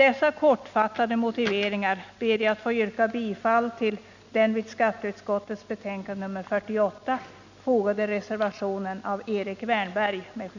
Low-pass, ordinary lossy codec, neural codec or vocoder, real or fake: 7.2 kHz; none; none; real